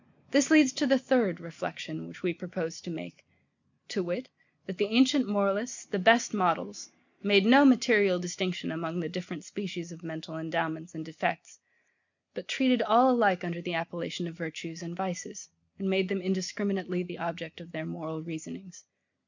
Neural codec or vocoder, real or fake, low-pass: none; real; 7.2 kHz